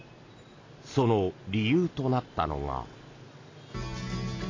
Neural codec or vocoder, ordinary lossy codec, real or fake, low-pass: none; AAC, 32 kbps; real; 7.2 kHz